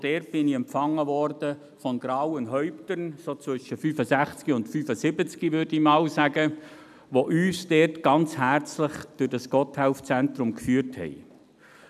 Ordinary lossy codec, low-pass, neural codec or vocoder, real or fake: none; 14.4 kHz; none; real